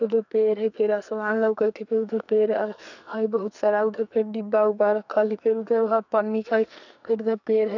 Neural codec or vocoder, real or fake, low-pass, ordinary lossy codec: codec, 44.1 kHz, 2.6 kbps, SNAC; fake; 7.2 kHz; none